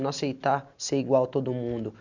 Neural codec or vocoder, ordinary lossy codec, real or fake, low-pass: none; none; real; 7.2 kHz